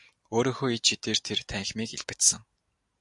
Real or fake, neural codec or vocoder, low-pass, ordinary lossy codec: real; none; 10.8 kHz; AAC, 64 kbps